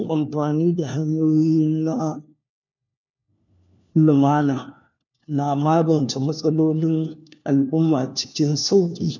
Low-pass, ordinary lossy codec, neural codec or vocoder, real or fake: 7.2 kHz; none; codec, 16 kHz, 1 kbps, FunCodec, trained on LibriTTS, 50 frames a second; fake